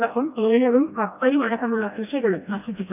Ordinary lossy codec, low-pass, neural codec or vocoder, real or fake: none; 3.6 kHz; codec, 16 kHz, 1 kbps, FreqCodec, smaller model; fake